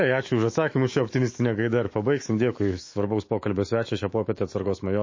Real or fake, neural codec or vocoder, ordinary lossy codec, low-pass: fake; autoencoder, 48 kHz, 128 numbers a frame, DAC-VAE, trained on Japanese speech; MP3, 32 kbps; 7.2 kHz